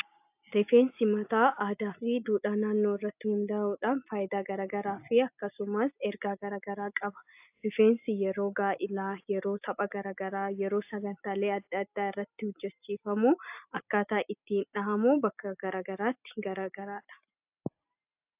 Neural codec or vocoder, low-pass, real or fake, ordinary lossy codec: none; 3.6 kHz; real; AAC, 32 kbps